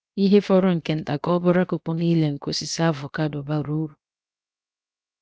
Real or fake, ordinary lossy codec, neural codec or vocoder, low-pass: fake; none; codec, 16 kHz, 0.7 kbps, FocalCodec; none